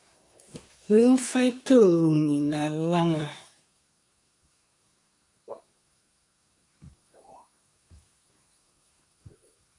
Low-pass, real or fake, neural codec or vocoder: 10.8 kHz; fake; codec, 24 kHz, 1 kbps, SNAC